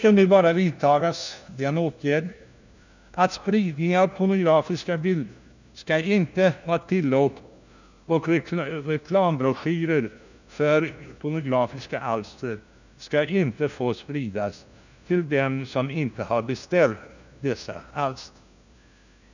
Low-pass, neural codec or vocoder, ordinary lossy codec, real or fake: 7.2 kHz; codec, 16 kHz, 1 kbps, FunCodec, trained on LibriTTS, 50 frames a second; none; fake